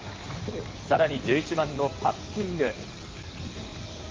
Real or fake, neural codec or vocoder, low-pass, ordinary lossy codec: fake; codec, 16 kHz in and 24 kHz out, 2.2 kbps, FireRedTTS-2 codec; 7.2 kHz; Opus, 32 kbps